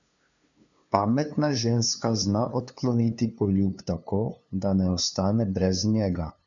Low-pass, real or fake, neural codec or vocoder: 7.2 kHz; fake; codec, 16 kHz, 2 kbps, FunCodec, trained on LibriTTS, 25 frames a second